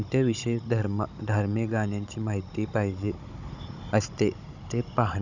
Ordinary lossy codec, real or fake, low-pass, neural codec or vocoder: none; fake; 7.2 kHz; codec, 16 kHz, 16 kbps, FunCodec, trained on Chinese and English, 50 frames a second